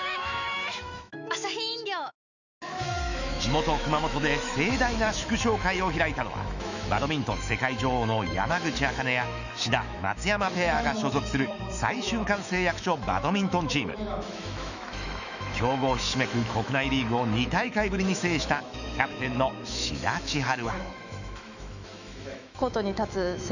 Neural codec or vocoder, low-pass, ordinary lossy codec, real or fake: autoencoder, 48 kHz, 128 numbers a frame, DAC-VAE, trained on Japanese speech; 7.2 kHz; none; fake